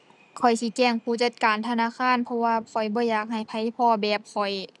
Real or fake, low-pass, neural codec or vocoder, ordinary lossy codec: real; none; none; none